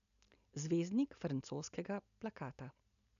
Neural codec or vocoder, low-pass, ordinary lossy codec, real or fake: none; 7.2 kHz; none; real